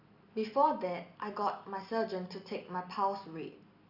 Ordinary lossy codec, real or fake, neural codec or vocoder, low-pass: Opus, 64 kbps; real; none; 5.4 kHz